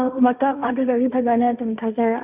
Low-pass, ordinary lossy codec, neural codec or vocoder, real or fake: 3.6 kHz; none; codec, 16 kHz, 1.1 kbps, Voila-Tokenizer; fake